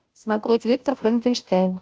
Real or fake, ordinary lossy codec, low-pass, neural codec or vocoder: fake; none; none; codec, 16 kHz, 0.5 kbps, FunCodec, trained on Chinese and English, 25 frames a second